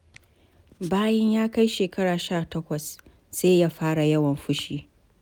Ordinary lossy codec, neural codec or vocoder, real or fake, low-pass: none; none; real; none